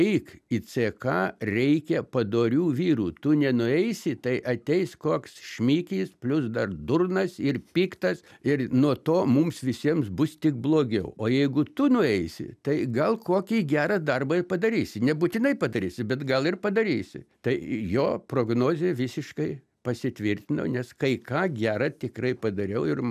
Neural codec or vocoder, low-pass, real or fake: none; 14.4 kHz; real